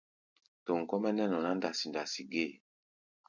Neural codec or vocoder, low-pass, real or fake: none; 7.2 kHz; real